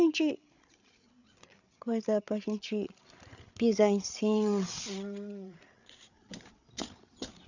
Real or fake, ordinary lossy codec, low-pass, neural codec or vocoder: fake; none; 7.2 kHz; codec, 16 kHz, 8 kbps, FreqCodec, larger model